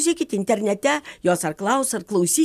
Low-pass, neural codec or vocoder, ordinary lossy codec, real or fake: 14.4 kHz; none; AAC, 96 kbps; real